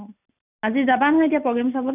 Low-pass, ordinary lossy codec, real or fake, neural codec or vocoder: 3.6 kHz; none; real; none